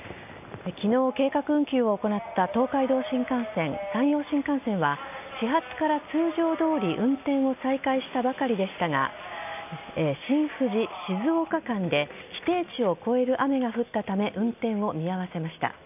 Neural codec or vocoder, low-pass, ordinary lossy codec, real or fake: none; 3.6 kHz; none; real